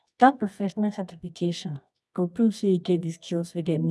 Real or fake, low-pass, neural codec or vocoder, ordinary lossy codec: fake; none; codec, 24 kHz, 0.9 kbps, WavTokenizer, medium music audio release; none